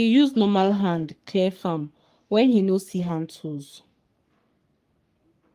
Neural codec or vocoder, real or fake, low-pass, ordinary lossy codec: codec, 44.1 kHz, 3.4 kbps, Pupu-Codec; fake; 14.4 kHz; Opus, 32 kbps